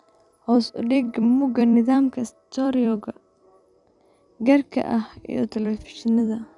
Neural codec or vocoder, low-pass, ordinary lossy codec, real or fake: vocoder, 44.1 kHz, 128 mel bands every 256 samples, BigVGAN v2; 10.8 kHz; none; fake